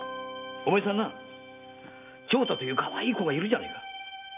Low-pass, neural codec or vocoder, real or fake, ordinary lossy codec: 3.6 kHz; none; real; none